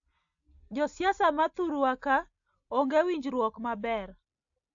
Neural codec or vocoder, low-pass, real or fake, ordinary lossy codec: none; 7.2 kHz; real; AAC, 64 kbps